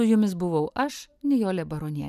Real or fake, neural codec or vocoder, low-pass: real; none; 14.4 kHz